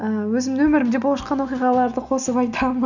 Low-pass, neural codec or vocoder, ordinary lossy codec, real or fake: 7.2 kHz; none; none; real